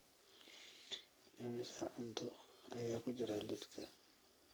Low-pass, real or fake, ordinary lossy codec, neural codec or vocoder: none; fake; none; codec, 44.1 kHz, 3.4 kbps, Pupu-Codec